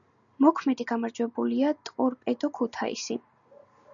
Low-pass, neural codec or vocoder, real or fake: 7.2 kHz; none; real